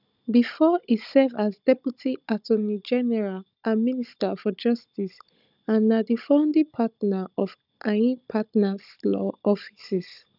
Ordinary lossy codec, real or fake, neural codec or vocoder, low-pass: none; fake; codec, 16 kHz, 16 kbps, FunCodec, trained on Chinese and English, 50 frames a second; 5.4 kHz